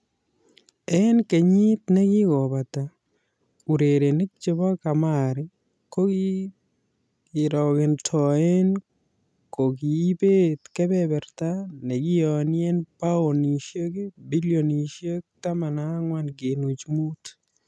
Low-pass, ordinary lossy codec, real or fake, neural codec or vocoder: none; none; real; none